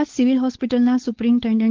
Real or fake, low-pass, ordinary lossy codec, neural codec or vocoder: fake; 7.2 kHz; Opus, 16 kbps; codec, 16 kHz, 4.8 kbps, FACodec